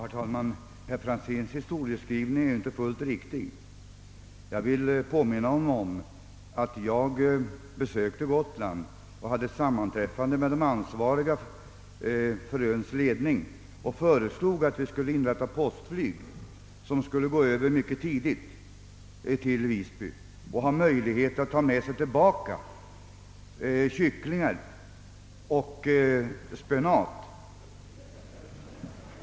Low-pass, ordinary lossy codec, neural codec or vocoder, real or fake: none; none; none; real